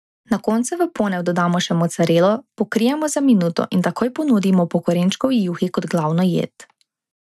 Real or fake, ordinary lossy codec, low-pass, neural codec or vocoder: real; none; none; none